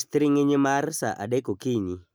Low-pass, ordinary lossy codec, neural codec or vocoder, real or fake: none; none; none; real